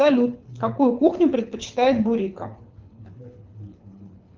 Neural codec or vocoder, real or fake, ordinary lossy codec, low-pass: codec, 24 kHz, 6 kbps, HILCodec; fake; Opus, 32 kbps; 7.2 kHz